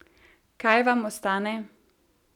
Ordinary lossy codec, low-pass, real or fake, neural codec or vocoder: none; 19.8 kHz; real; none